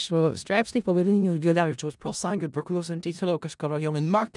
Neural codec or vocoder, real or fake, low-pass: codec, 16 kHz in and 24 kHz out, 0.4 kbps, LongCat-Audio-Codec, four codebook decoder; fake; 10.8 kHz